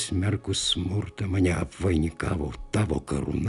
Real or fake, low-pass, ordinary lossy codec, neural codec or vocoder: real; 10.8 kHz; AAC, 96 kbps; none